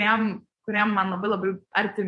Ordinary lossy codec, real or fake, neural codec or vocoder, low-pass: MP3, 48 kbps; fake; vocoder, 48 kHz, 128 mel bands, Vocos; 10.8 kHz